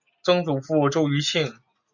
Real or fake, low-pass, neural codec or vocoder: real; 7.2 kHz; none